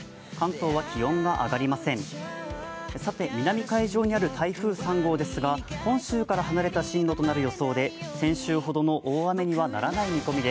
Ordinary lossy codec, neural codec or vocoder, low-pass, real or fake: none; none; none; real